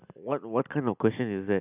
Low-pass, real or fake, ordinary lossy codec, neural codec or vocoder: 3.6 kHz; real; none; none